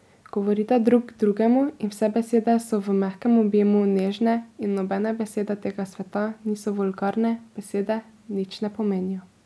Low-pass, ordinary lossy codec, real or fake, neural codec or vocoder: none; none; real; none